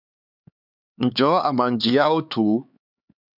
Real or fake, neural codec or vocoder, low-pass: fake; codec, 16 kHz, 4 kbps, X-Codec, HuBERT features, trained on LibriSpeech; 5.4 kHz